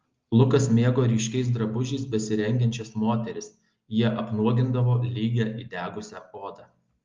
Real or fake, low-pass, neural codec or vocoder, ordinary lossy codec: real; 7.2 kHz; none; Opus, 32 kbps